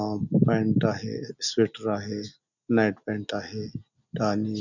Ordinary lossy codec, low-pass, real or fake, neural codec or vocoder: none; 7.2 kHz; real; none